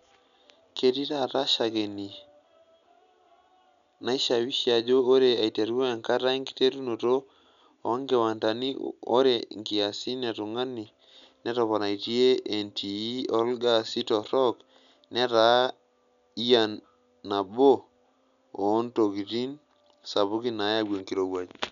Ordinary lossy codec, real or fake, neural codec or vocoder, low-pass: none; real; none; 7.2 kHz